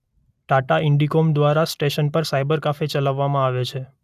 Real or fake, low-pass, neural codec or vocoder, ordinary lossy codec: real; 14.4 kHz; none; none